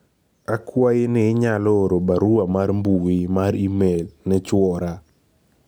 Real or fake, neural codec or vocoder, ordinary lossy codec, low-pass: real; none; none; none